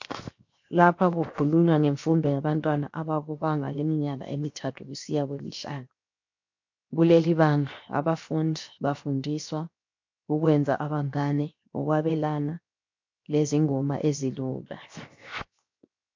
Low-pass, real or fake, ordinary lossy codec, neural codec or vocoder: 7.2 kHz; fake; MP3, 48 kbps; codec, 16 kHz, 0.7 kbps, FocalCodec